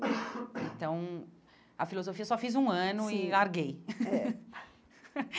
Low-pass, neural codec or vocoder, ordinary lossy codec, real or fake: none; none; none; real